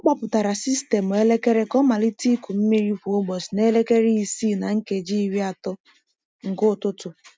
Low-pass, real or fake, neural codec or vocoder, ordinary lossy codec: none; real; none; none